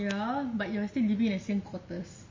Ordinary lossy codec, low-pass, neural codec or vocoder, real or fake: MP3, 32 kbps; 7.2 kHz; none; real